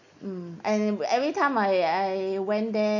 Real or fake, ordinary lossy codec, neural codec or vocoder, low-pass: real; none; none; 7.2 kHz